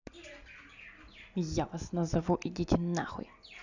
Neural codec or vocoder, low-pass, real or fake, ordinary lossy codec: none; 7.2 kHz; real; none